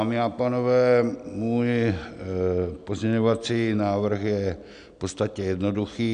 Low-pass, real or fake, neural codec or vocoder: 9.9 kHz; real; none